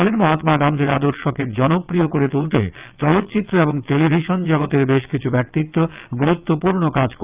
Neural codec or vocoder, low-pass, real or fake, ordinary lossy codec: vocoder, 22.05 kHz, 80 mel bands, WaveNeXt; 3.6 kHz; fake; Opus, 24 kbps